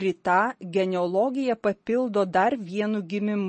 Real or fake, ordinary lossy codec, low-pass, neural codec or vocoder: real; MP3, 32 kbps; 9.9 kHz; none